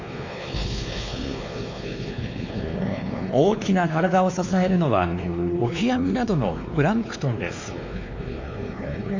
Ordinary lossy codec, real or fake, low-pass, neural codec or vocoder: MP3, 64 kbps; fake; 7.2 kHz; codec, 16 kHz, 2 kbps, X-Codec, WavLM features, trained on Multilingual LibriSpeech